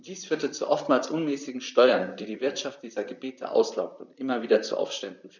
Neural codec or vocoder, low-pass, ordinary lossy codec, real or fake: vocoder, 44.1 kHz, 128 mel bands, Pupu-Vocoder; 7.2 kHz; none; fake